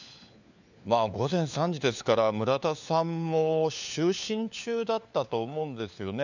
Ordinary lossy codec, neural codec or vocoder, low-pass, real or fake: none; codec, 16 kHz, 4 kbps, FunCodec, trained on LibriTTS, 50 frames a second; 7.2 kHz; fake